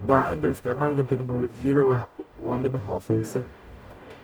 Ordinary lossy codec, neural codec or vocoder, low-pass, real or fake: none; codec, 44.1 kHz, 0.9 kbps, DAC; none; fake